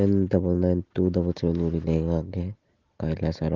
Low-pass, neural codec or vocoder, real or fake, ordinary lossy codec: 7.2 kHz; none; real; Opus, 16 kbps